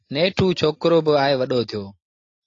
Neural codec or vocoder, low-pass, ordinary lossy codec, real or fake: none; 7.2 kHz; AAC, 32 kbps; real